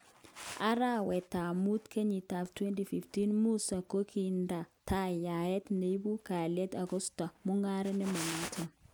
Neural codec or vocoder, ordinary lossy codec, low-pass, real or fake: none; none; none; real